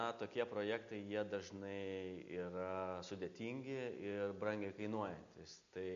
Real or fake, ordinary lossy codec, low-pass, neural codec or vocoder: real; MP3, 96 kbps; 7.2 kHz; none